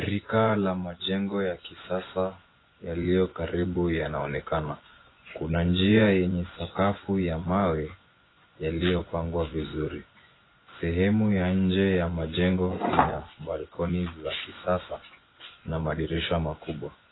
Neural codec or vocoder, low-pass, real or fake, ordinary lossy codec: vocoder, 24 kHz, 100 mel bands, Vocos; 7.2 kHz; fake; AAC, 16 kbps